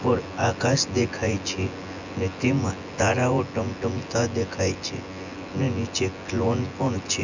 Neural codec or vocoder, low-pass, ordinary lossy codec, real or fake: vocoder, 24 kHz, 100 mel bands, Vocos; 7.2 kHz; none; fake